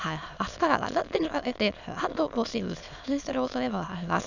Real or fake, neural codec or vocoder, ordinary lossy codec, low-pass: fake; autoencoder, 22.05 kHz, a latent of 192 numbers a frame, VITS, trained on many speakers; none; 7.2 kHz